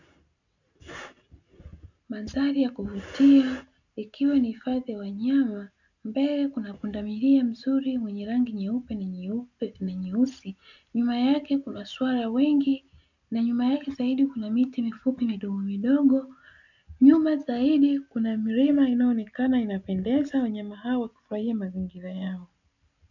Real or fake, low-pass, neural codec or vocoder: real; 7.2 kHz; none